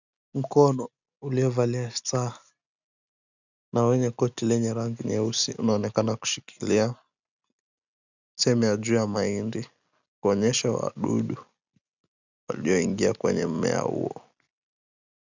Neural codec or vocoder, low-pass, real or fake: none; 7.2 kHz; real